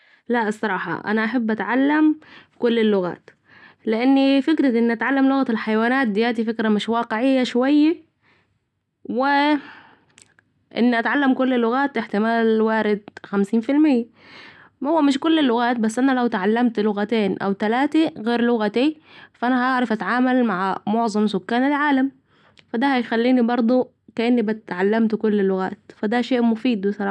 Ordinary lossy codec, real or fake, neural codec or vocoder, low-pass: none; real; none; none